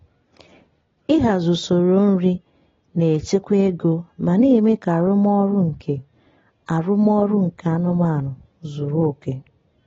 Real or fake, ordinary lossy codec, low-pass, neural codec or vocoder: real; AAC, 24 kbps; 7.2 kHz; none